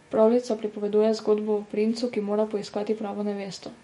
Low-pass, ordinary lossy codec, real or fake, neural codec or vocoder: 19.8 kHz; MP3, 48 kbps; fake; autoencoder, 48 kHz, 128 numbers a frame, DAC-VAE, trained on Japanese speech